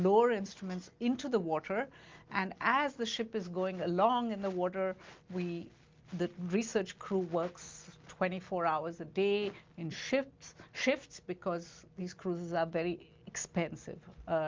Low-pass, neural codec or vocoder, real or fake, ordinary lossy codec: 7.2 kHz; none; real; Opus, 24 kbps